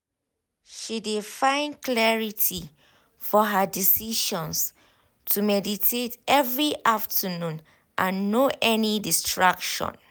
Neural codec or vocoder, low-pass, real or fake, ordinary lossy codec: none; none; real; none